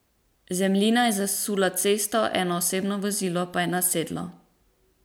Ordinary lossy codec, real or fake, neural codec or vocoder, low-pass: none; real; none; none